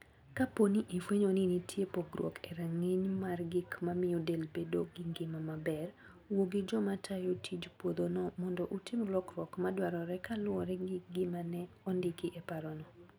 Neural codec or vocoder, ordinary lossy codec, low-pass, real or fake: none; none; none; real